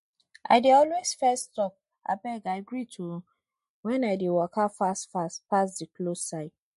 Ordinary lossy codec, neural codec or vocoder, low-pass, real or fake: MP3, 48 kbps; none; 14.4 kHz; real